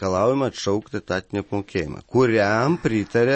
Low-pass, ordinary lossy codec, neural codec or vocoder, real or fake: 9.9 kHz; MP3, 32 kbps; none; real